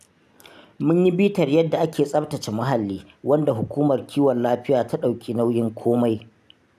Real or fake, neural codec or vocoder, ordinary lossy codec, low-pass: real; none; none; 14.4 kHz